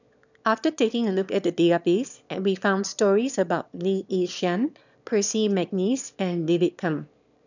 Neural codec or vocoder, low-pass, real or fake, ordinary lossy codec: autoencoder, 22.05 kHz, a latent of 192 numbers a frame, VITS, trained on one speaker; 7.2 kHz; fake; none